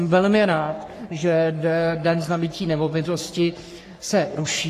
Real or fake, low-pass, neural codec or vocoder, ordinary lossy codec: fake; 14.4 kHz; codec, 44.1 kHz, 3.4 kbps, Pupu-Codec; AAC, 48 kbps